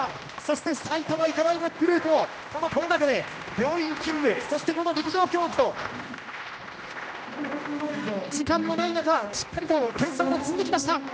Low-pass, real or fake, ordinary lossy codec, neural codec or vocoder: none; fake; none; codec, 16 kHz, 1 kbps, X-Codec, HuBERT features, trained on general audio